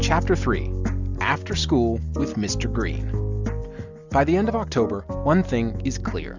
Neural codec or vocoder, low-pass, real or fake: none; 7.2 kHz; real